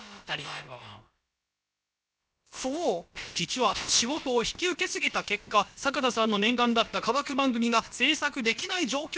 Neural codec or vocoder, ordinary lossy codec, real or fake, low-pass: codec, 16 kHz, about 1 kbps, DyCAST, with the encoder's durations; none; fake; none